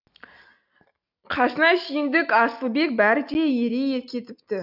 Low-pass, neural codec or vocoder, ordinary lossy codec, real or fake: 5.4 kHz; none; none; real